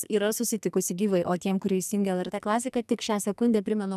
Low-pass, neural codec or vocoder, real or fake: 14.4 kHz; codec, 44.1 kHz, 2.6 kbps, SNAC; fake